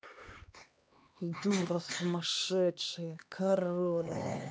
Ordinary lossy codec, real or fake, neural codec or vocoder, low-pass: none; fake; codec, 16 kHz, 2 kbps, X-Codec, HuBERT features, trained on LibriSpeech; none